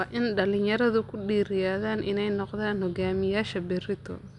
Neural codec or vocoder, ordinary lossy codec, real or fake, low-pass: none; none; real; 10.8 kHz